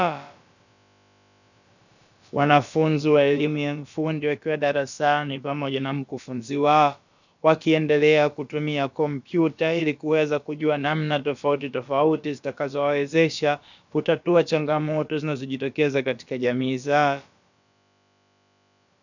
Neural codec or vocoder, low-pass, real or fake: codec, 16 kHz, about 1 kbps, DyCAST, with the encoder's durations; 7.2 kHz; fake